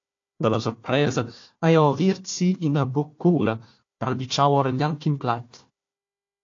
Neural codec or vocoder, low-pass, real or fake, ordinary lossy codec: codec, 16 kHz, 1 kbps, FunCodec, trained on Chinese and English, 50 frames a second; 7.2 kHz; fake; AAC, 48 kbps